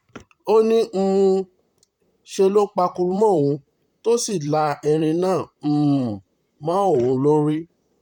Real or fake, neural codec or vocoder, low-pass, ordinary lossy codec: fake; vocoder, 44.1 kHz, 128 mel bands, Pupu-Vocoder; 19.8 kHz; none